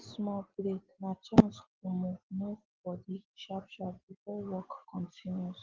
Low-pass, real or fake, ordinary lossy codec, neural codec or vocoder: 7.2 kHz; real; Opus, 16 kbps; none